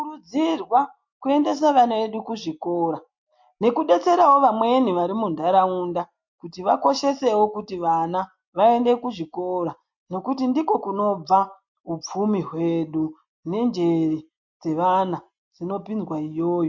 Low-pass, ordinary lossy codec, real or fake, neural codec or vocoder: 7.2 kHz; MP3, 48 kbps; real; none